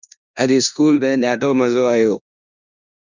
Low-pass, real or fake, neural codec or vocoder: 7.2 kHz; fake; codec, 16 kHz in and 24 kHz out, 0.9 kbps, LongCat-Audio-Codec, four codebook decoder